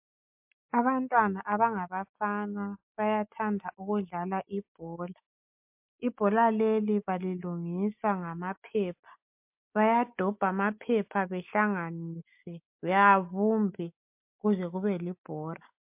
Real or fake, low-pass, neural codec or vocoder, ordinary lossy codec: real; 3.6 kHz; none; MP3, 32 kbps